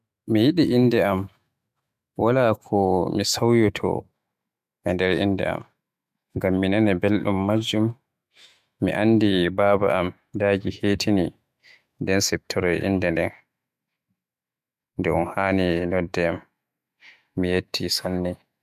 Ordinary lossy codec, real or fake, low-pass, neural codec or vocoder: MP3, 96 kbps; fake; 14.4 kHz; autoencoder, 48 kHz, 128 numbers a frame, DAC-VAE, trained on Japanese speech